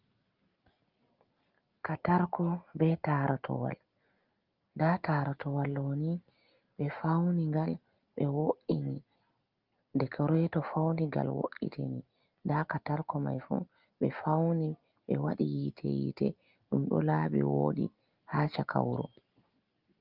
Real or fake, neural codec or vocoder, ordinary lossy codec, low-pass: real; none; Opus, 24 kbps; 5.4 kHz